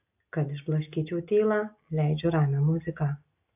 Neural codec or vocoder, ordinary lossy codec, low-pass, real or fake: none; AAC, 32 kbps; 3.6 kHz; real